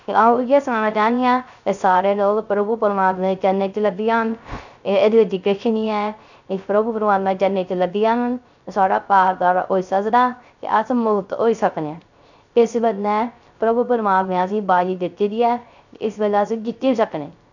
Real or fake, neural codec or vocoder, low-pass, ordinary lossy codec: fake; codec, 16 kHz, 0.3 kbps, FocalCodec; 7.2 kHz; none